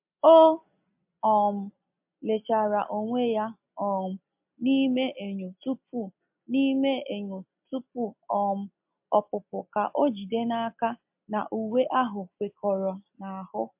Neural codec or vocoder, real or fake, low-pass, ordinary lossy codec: none; real; 3.6 kHz; MP3, 32 kbps